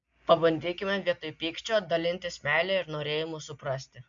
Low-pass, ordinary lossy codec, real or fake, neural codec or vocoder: 7.2 kHz; MP3, 64 kbps; real; none